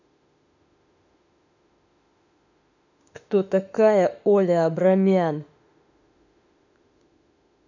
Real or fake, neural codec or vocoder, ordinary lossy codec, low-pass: fake; autoencoder, 48 kHz, 32 numbers a frame, DAC-VAE, trained on Japanese speech; none; 7.2 kHz